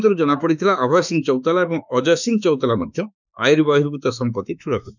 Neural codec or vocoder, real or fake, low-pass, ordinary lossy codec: autoencoder, 48 kHz, 32 numbers a frame, DAC-VAE, trained on Japanese speech; fake; 7.2 kHz; none